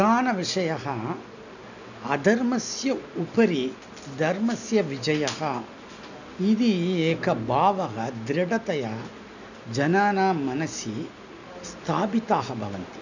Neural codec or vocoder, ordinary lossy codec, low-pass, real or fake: none; none; 7.2 kHz; real